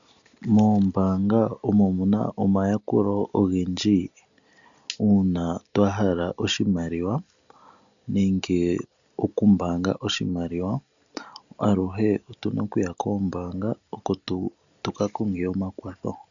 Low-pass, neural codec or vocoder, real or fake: 7.2 kHz; none; real